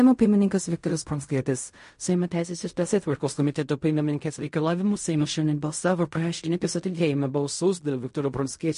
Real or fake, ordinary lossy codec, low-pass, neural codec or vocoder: fake; MP3, 48 kbps; 10.8 kHz; codec, 16 kHz in and 24 kHz out, 0.4 kbps, LongCat-Audio-Codec, fine tuned four codebook decoder